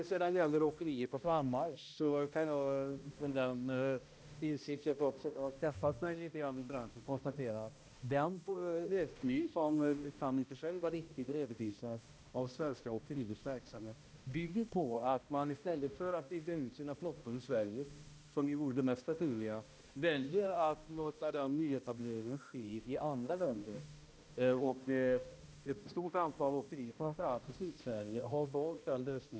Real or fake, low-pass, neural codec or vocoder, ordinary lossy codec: fake; none; codec, 16 kHz, 1 kbps, X-Codec, HuBERT features, trained on balanced general audio; none